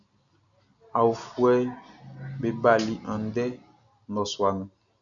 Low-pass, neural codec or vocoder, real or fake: 7.2 kHz; none; real